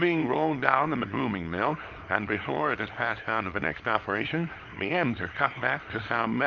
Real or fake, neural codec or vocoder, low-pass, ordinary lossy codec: fake; codec, 24 kHz, 0.9 kbps, WavTokenizer, small release; 7.2 kHz; Opus, 24 kbps